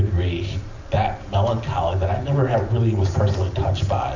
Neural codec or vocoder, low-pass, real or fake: none; 7.2 kHz; real